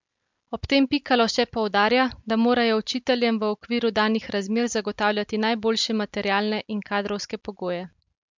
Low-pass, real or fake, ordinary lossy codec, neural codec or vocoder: 7.2 kHz; real; MP3, 64 kbps; none